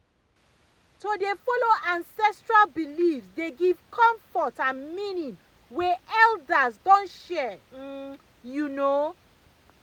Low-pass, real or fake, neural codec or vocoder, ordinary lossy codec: none; real; none; none